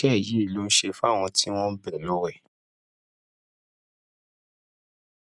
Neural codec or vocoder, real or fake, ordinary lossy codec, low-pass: none; real; none; 10.8 kHz